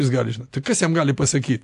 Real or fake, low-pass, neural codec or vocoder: real; 10.8 kHz; none